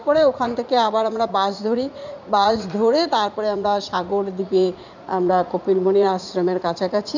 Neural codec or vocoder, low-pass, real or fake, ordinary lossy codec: vocoder, 44.1 kHz, 80 mel bands, Vocos; 7.2 kHz; fake; none